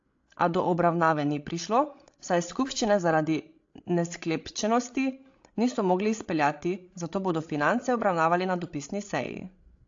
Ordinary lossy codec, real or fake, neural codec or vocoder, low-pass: AAC, 48 kbps; fake; codec, 16 kHz, 16 kbps, FreqCodec, larger model; 7.2 kHz